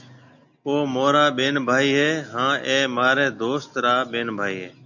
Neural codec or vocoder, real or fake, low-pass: none; real; 7.2 kHz